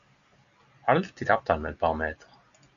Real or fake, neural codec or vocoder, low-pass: real; none; 7.2 kHz